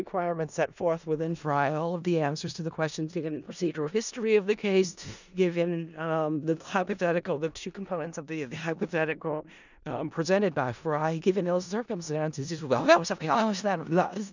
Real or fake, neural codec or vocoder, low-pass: fake; codec, 16 kHz in and 24 kHz out, 0.4 kbps, LongCat-Audio-Codec, four codebook decoder; 7.2 kHz